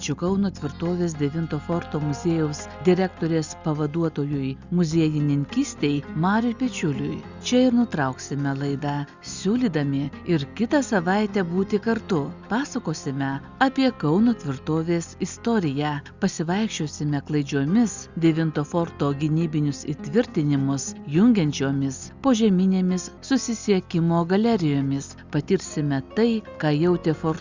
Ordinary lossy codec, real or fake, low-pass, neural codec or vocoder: Opus, 64 kbps; real; 7.2 kHz; none